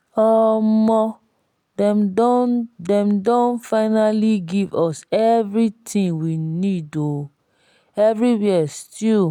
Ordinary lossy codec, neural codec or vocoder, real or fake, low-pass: none; none; real; 19.8 kHz